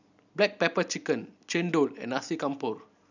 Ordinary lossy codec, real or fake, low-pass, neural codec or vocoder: none; real; 7.2 kHz; none